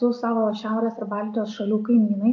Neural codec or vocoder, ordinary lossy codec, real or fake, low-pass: none; AAC, 48 kbps; real; 7.2 kHz